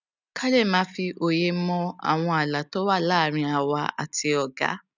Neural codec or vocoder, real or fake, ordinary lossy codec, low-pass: none; real; none; 7.2 kHz